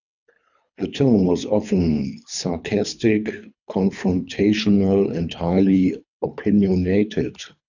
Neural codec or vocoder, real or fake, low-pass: codec, 24 kHz, 3 kbps, HILCodec; fake; 7.2 kHz